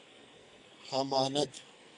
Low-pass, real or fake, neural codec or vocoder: 10.8 kHz; fake; codec, 44.1 kHz, 2.6 kbps, SNAC